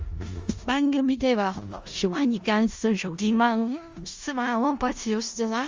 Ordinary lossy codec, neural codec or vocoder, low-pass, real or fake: Opus, 32 kbps; codec, 16 kHz in and 24 kHz out, 0.4 kbps, LongCat-Audio-Codec, four codebook decoder; 7.2 kHz; fake